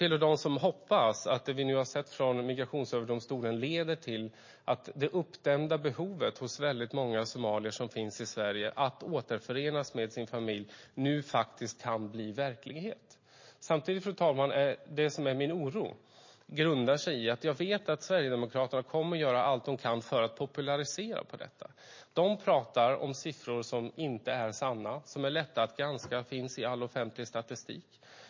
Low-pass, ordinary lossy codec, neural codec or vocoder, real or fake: 7.2 kHz; MP3, 32 kbps; none; real